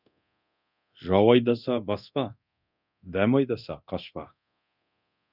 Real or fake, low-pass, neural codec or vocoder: fake; 5.4 kHz; codec, 24 kHz, 0.9 kbps, DualCodec